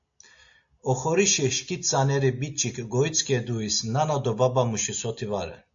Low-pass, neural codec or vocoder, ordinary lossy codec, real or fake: 7.2 kHz; none; AAC, 64 kbps; real